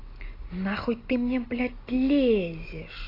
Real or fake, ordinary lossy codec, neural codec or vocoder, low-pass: real; AAC, 24 kbps; none; 5.4 kHz